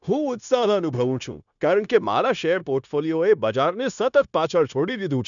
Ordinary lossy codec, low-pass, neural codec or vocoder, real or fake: none; 7.2 kHz; codec, 16 kHz, 0.9 kbps, LongCat-Audio-Codec; fake